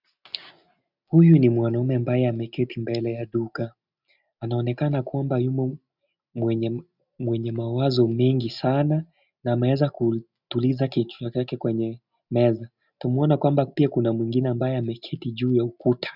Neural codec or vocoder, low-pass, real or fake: none; 5.4 kHz; real